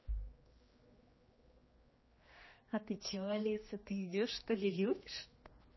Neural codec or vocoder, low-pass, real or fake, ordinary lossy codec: codec, 16 kHz, 2 kbps, X-Codec, HuBERT features, trained on general audio; 7.2 kHz; fake; MP3, 24 kbps